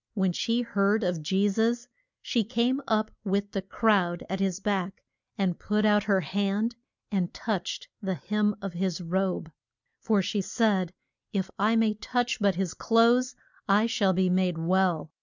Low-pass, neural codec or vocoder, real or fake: 7.2 kHz; none; real